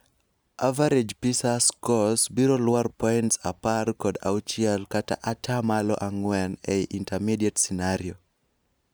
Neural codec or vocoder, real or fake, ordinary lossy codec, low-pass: none; real; none; none